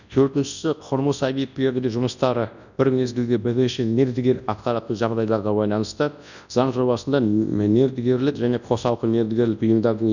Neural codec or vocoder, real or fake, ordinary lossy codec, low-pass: codec, 24 kHz, 0.9 kbps, WavTokenizer, large speech release; fake; none; 7.2 kHz